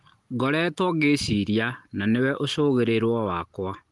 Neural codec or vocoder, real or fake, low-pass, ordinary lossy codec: none; real; 10.8 kHz; Opus, 24 kbps